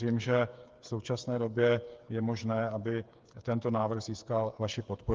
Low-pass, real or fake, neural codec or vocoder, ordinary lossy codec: 7.2 kHz; fake; codec, 16 kHz, 8 kbps, FreqCodec, smaller model; Opus, 32 kbps